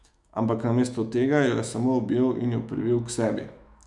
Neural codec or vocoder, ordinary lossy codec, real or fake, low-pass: autoencoder, 48 kHz, 128 numbers a frame, DAC-VAE, trained on Japanese speech; none; fake; 10.8 kHz